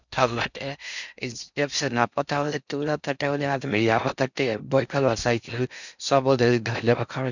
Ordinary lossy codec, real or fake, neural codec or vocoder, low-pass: none; fake; codec, 16 kHz in and 24 kHz out, 0.6 kbps, FocalCodec, streaming, 4096 codes; 7.2 kHz